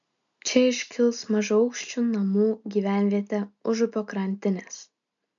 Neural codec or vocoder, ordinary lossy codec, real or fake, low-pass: none; MP3, 96 kbps; real; 7.2 kHz